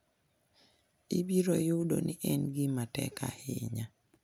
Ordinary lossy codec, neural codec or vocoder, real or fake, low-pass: none; none; real; none